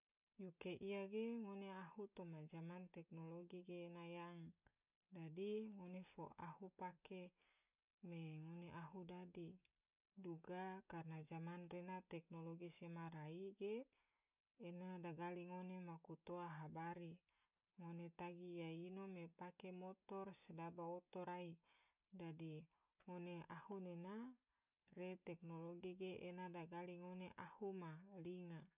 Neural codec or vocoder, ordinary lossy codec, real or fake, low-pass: none; none; real; 3.6 kHz